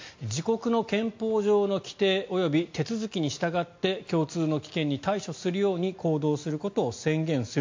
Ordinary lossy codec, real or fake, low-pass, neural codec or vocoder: MP3, 48 kbps; real; 7.2 kHz; none